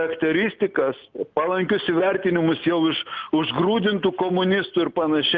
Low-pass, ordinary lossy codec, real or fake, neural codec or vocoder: 7.2 kHz; Opus, 32 kbps; real; none